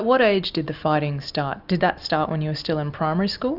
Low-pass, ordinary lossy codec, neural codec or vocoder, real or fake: 5.4 kHz; Opus, 64 kbps; none; real